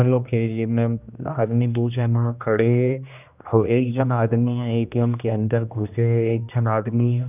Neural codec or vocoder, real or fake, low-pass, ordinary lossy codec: codec, 16 kHz, 1 kbps, X-Codec, HuBERT features, trained on general audio; fake; 3.6 kHz; none